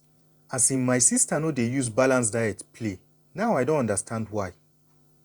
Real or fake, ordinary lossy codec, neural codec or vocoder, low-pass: fake; Opus, 64 kbps; vocoder, 48 kHz, 128 mel bands, Vocos; 19.8 kHz